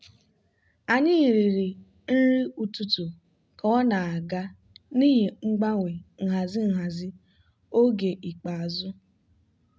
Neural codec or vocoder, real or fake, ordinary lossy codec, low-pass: none; real; none; none